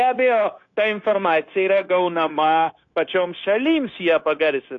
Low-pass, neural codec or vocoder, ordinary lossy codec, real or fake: 7.2 kHz; codec, 16 kHz, 0.9 kbps, LongCat-Audio-Codec; AAC, 48 kbps; fake